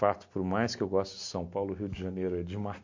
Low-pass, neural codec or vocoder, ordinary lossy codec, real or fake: 7.2 kHz; none; MP3, 48 kbps; real